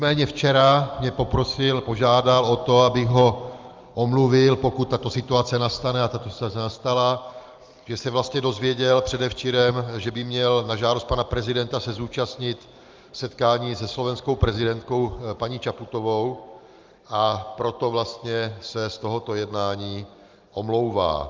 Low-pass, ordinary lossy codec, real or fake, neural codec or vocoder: 7.2 kHz; Opus, 32 kbps; real; none